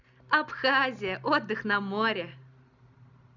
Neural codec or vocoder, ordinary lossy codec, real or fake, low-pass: none; none; real; 7.2 kHz